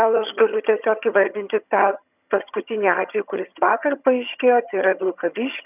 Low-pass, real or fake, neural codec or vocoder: 3.6 kHz; fake; vocoder, 22.05 kHz, 80 mel bands, HiFi-GAN